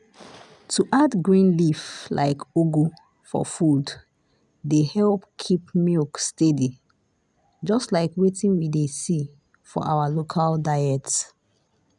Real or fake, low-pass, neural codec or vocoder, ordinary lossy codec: real; 10.8 kHz; none; none